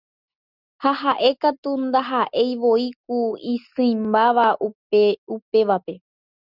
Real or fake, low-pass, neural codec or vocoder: real; 5.4 kHz; none